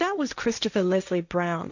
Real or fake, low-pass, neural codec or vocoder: fake; 7.2 kHz; codec, 16 kHz, 1.1 kbps, Voila-Tokenizer